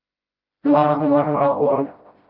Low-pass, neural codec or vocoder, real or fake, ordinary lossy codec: 5.4 kHz; codec, 16 kHz, 0.5 kbps, FreqCodec, smaller model; fake; Opus, 24 kbps